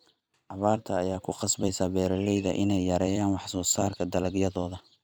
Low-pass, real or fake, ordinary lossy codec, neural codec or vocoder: none; fake; none; vocoder, 44.1 kHz, 128 mel bands every 256 samples, BigVGAN v2